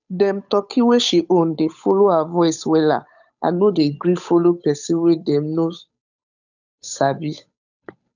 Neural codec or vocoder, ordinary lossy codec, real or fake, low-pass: codec, 16 kHz, 8 kbps, FunCodec, trained on Chinese and English, 25 frames a second; none; fake; 7.2 kHz